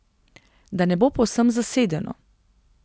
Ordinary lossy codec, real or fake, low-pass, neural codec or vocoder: none; real; none; none